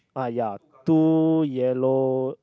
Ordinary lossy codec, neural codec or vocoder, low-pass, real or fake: none; none; none; real